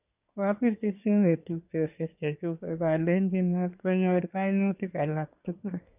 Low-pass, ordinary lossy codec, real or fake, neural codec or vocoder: 3.6 kHz; none; fake; codec, 24 kHz, 1 kbps, SNAC